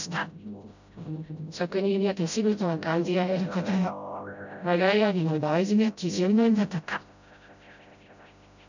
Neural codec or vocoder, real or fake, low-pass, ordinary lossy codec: codec, 16 kHz, 0.5 kbps, FreqCodec, smaller model; fake; 7.2 kHz; none